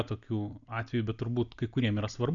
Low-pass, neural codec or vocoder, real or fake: 7.2 kHz; none; real